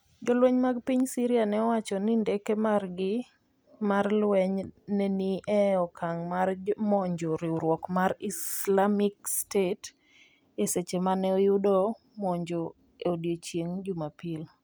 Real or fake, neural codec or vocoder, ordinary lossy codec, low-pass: real; none; none; none